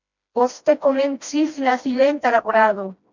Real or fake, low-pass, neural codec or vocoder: fake; 7.2 kHz; codec, 16 kHz, 1 kbps, FreqCodec, smaller model